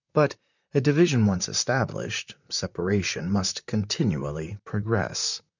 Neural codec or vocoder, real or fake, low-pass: vocoder, 44.1 kHz, 128 mel bands, Pupu-Vocoder; fake; 7.2 kHz